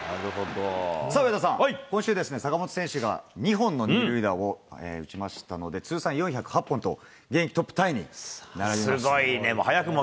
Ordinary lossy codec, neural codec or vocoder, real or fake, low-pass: none; none; real; none